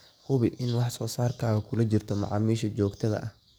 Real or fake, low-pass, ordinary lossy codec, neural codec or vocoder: fake; none; none; codec, 44.1 kHz, 7.8 kbps, DAC